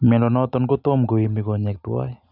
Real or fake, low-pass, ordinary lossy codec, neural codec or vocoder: real; 5.4 kHz; none; none